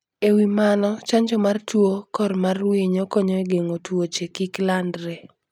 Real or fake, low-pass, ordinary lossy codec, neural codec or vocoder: real; 19.8 kHz; none; none